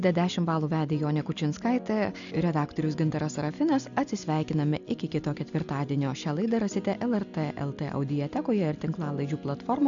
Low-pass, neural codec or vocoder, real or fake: 7.2 kHz; none; real